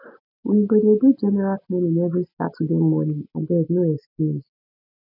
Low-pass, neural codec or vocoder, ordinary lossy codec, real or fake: 5.4 kHz; none; none; real